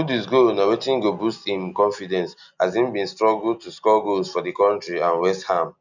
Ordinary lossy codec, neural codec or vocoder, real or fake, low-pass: none; none; real; 7.2 kHz